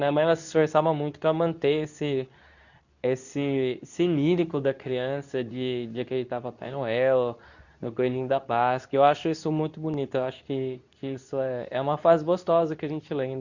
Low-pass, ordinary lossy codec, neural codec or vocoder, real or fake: 7.2 kHz; none; codec, 24 kHz, 0.9 kbps, WavTokenizer, medium speech release version 2; fake